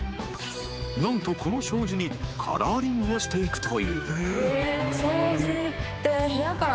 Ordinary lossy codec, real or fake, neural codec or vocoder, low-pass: none; fake; codec, 16 kHz, 4 kbps, X-Codec, HuBERT features, trained on general audio; none